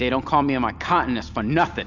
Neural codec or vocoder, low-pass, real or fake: none; 7.2 kHz; real